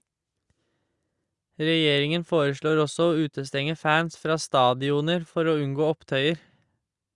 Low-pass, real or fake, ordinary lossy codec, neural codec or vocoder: 10.8 kHz; real; Opus, 64 kbps; none